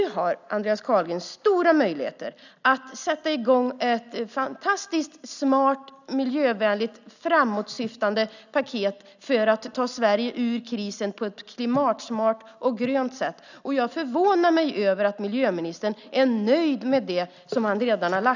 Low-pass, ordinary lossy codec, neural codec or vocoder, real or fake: 7.2 kHz; none; none; real